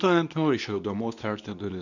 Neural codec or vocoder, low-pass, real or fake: codec, 24 kHz, 0.9 kbps, WavTokenizer, medium speech release version 2; 7.2 kHz; fake